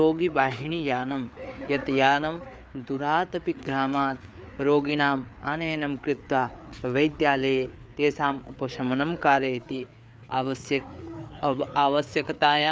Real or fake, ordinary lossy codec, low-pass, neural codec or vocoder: fake; none; none; codec, 16 kHz, 4 kbps, FreqCodec, larger model